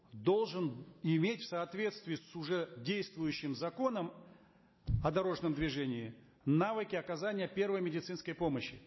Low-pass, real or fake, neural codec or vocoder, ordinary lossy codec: 7.2 kHz; real; none; MP3, 24 kbps